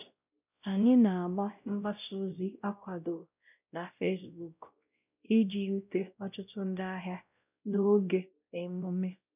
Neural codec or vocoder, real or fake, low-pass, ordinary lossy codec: codec, 16 kHz, 0.5 kbps, X-Codec, WavLM features, trained on Multilingual LibriSpeech; fake; 3.6 kHz; none